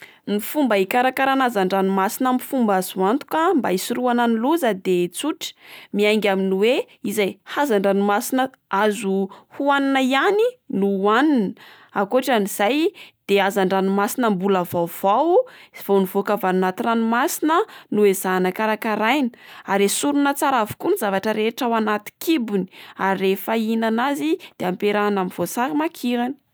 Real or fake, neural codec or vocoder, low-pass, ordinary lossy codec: real; none; none; none